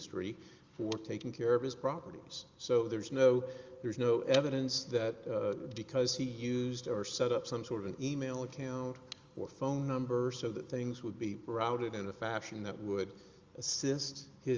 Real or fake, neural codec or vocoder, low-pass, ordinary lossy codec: real; none; 7.2 kHz; Opus, 32 kbps